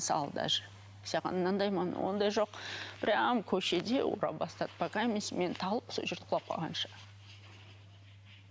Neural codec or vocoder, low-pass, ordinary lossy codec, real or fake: none; none; none; real